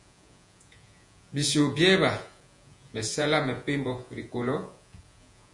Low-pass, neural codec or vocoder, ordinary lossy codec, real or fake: 10.8 kHz; vocoder, 48 kHz, 128 mel bands, Vocos; MP3, 64 kbps; fake